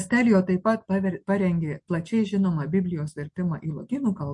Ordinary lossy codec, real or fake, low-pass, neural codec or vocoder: MP3, 48 kbps; real; 10.8 kHz; none